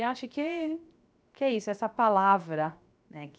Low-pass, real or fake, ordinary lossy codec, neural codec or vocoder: none; fake; none; codec, 16 kHz, 0.7 kbps, FocalCodec